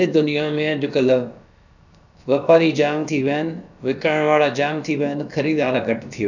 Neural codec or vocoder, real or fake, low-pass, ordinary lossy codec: codec, 16 kHz, about 1 kbps, DyCAST, with the encoder's durations; fake; 7.2 kHz; none